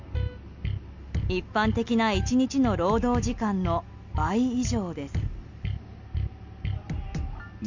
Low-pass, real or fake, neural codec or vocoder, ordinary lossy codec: 7.2 kHz; real; none; MP3, 64 kbps